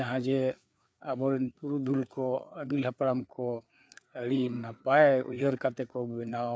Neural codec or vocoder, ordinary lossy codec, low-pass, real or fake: codec, 16 kHz, 4 kbps, FreqCodec, larger model; none; none; fake